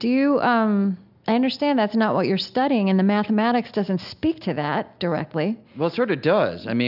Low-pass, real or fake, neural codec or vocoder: 5.4 kHz; real; none